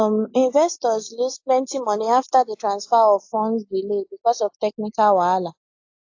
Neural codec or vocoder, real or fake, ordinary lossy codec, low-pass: none; real; AAC, 48 kbps; 7.2 kHz